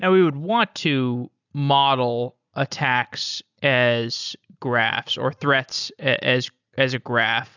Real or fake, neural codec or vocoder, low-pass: real; none; 7.2 kHz